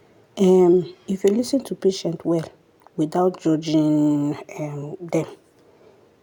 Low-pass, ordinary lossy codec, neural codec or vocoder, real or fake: 19.8 kHz; none; none; real